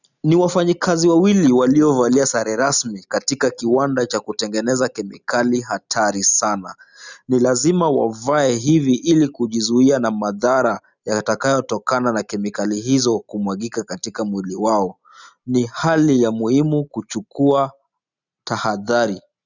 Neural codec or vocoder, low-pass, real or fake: none; 7.2 kHz; real